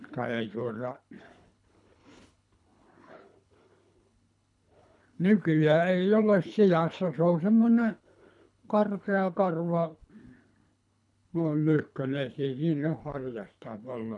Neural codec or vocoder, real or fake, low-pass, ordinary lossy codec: codec, 24 kHz, 3 kbps, HILCodec; fake; 10.8 kHz; none